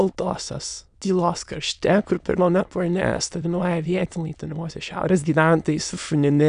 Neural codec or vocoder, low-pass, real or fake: autoencoder, 22.05 kHz, a latent of 192 numbers a frame, VITS, trained on many speakers; 9.9 kHz; fake